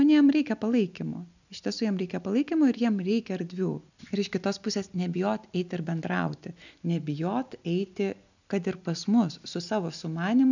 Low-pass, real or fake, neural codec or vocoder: 7.2 kHz; real; none